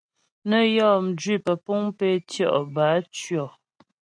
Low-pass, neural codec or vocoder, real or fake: 9.9 kHz; none; real